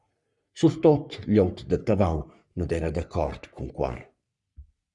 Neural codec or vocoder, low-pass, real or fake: codec, 44.1 kHz, 3.4 kbps, Pupu-Codec; 10.8 kHz; fake